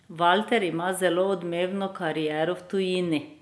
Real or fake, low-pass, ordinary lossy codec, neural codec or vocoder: real; none; none; none